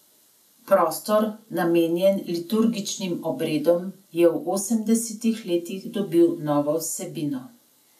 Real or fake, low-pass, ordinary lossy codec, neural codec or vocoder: real; 14.4 kHz; none; none